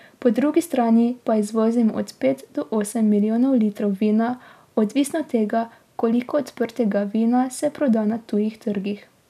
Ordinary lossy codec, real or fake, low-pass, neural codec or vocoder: none; real; 14.4 kHz; none